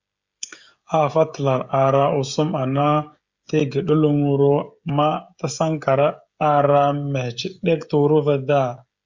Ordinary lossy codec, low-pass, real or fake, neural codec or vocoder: Opus, 64 kbps; 7.2 kHz; fake; codec, 16 kHz, 16 kbps, FreqCodec, smaller model